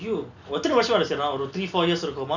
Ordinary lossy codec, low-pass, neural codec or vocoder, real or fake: none; 7.2 kHz; none; real